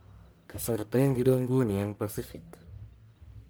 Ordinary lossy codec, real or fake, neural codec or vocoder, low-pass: none; fake; codec, 44.1 kHz, 1.7 kbps, Pupu-Codec; none